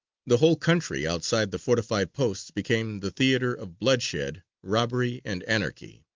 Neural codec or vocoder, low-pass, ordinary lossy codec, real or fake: none; 7.2 kHz; Opus, 24 kbps; real